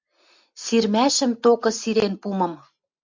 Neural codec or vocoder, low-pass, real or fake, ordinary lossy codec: none; 7.2 kHz; real; MP3, 64 kbps